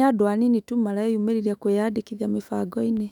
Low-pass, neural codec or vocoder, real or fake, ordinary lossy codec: 19.8 kHz; autoencoder, 48 kHz, 128 numbers a frame, DAC-VAE, trained on Japanese speech; fake; none